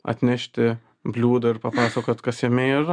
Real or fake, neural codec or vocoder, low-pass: real; none; 9.9 kHz